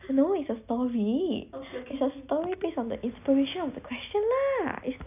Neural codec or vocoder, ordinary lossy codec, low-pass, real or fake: none; none; 3.6 kHz; real